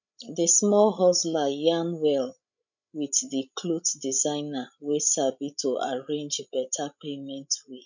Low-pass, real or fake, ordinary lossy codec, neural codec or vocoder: 7.2 kHz; fake; none; codec, 16 kHz, 16 kbps, FreqCodec, larger model